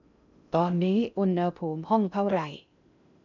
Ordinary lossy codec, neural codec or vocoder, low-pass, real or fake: none; codec, 16 kHz in and 24 kHz out, 0.6 kbps, FocalCodec, streaming, 2048 codes; 7.2 kHz; fake